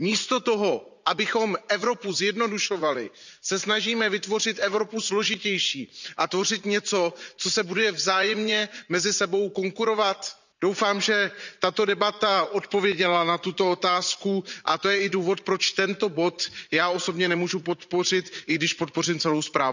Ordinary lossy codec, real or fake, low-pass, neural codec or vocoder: none; fake; 7.2 kHz; vocoder, 44.1 kHz, 80 mel bands, Vocos